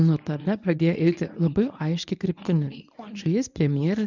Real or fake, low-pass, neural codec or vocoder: fake; 7.2 kHz; codec, 24 kHz, 0.9 kbps, WavTokenizer, medium speech release version 1